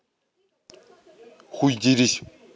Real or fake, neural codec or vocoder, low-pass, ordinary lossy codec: real; none; none; none